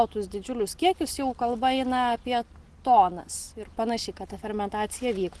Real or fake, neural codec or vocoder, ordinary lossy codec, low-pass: real; none; Opus, 16 kbps; 10.8 kHz